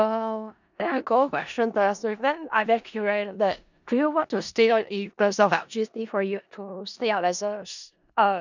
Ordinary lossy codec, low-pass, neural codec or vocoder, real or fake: none; 7.2 kHz; codec, 16 kHz in and 24 kHz out, 0.4 kbps, LongCat-Audio-Codec, four codebook decoder; fake